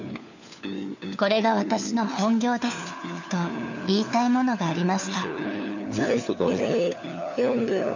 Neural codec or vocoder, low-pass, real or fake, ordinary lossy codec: codec, 16 kHz, 4 kbps, FunCodec, trained on LibriTTS, 50 frames a second; 7.2 kHz; fake; none